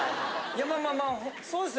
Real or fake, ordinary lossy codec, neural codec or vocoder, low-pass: real; none; none; none